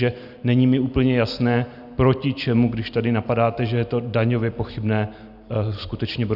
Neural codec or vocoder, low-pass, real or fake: none; 5.4 kHz; real